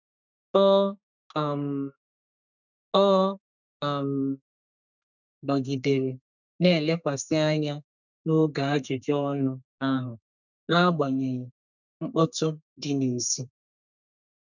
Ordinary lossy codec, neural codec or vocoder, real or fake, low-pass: none; codec, 32 kHz, 1.9 kbps, SNAC; fake; 7.2 kHz